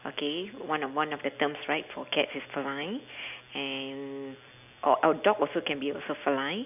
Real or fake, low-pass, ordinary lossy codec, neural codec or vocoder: real; 3.6 kHz; none; none